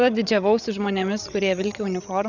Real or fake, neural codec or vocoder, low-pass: fake; codec, 16 kHz, 16 kbps, FreqCodec, larger model; 7.2 kHz